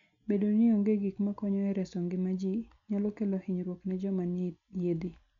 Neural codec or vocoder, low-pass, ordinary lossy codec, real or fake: none; 7.2 kHz; none; real